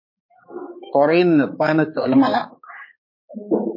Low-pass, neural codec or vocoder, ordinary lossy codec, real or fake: 5.4 kHz; codec, 16 kHz, 4 kbps, X-Codec, HuBERT features, trained on balanced general audio; MP3, 24 kbps; fake